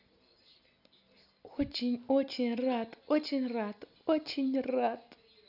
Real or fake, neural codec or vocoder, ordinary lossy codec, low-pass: real; none; none; 5.4 kHz